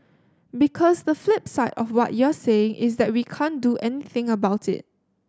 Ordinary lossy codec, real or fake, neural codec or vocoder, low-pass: none; real; none; none